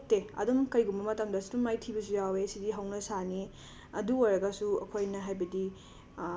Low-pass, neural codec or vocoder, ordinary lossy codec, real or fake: none; none; none; real